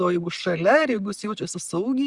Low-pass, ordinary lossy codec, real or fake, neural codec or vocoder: 10.8 kHz; Opus, 64 kbps; real; none